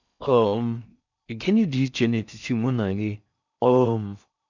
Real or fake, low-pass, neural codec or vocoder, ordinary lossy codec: fake; 7.2 kHz; codec, 16 kHz in and 24 kHz out, 0.6 kbps, FocalCodec, streaming, 4096 codes; none